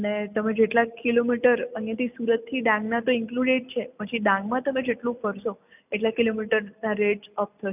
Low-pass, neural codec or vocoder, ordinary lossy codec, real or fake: 3.6 kHz; none; none; real